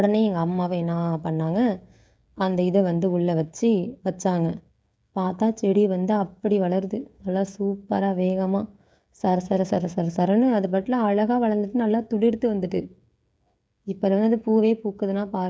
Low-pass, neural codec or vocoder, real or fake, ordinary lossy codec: none; codec, 16 kHz, 16 kbps, FreqCodec, smaller model; fake; none